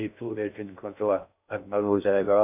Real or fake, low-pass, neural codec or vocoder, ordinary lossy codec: fake; 3.6 kHz; codec, 16 kHz in and 24 kHz out, 0.6 kbps, FocalCodec, streaming, 2048 codes; none